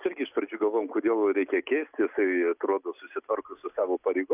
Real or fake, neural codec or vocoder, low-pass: real; none; 3.6 kHz